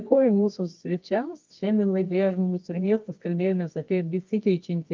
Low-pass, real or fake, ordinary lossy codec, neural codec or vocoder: 7.2 kHz; fake; Opus, 24 kbps; codec, 24 kHz, 0.9 kbps, WavTokenizer, medium music audio release